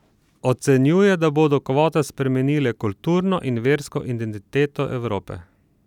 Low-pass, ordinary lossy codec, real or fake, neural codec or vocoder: 19.8 kHz; none; real; none